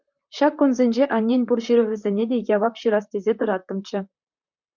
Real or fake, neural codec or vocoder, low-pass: fake; vocoder, 44.1 kHz, 128 mel bands, Pupu-Vocoder; 7.2 kHz